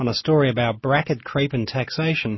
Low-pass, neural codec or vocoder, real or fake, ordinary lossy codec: 7.2 kHz; none; real; MP3, 24 kbps